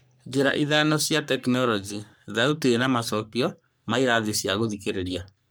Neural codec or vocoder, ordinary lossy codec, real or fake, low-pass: codec, 44.1 kHz, 3.4 kbps, Pupu-Codec; none; fake; none